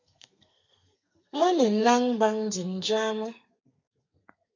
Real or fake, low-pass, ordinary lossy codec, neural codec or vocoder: fake; 7.2 kHz; MP3, 64 kbps; codec, 32 kHz, 1.9 kbps, SNAC